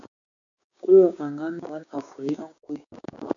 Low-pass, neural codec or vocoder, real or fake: 7.2 kHz; codec, 16 kHz, 6 kbps, DAC; fake